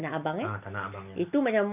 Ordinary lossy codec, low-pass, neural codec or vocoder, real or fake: none; 3.6 kHz; none; real